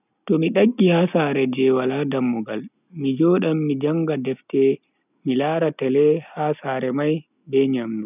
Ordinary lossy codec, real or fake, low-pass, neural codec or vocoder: none; real; 3.6 kHz; none